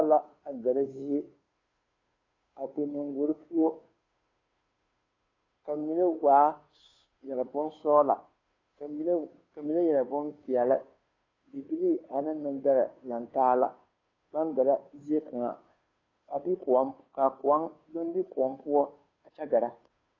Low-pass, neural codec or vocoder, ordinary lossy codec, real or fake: 7.2 kHz; codec, 16 kHz, 2 kbps, FunCodec, trained on Chinese and English, 25 frames a second; AAC, 32 kbps; fake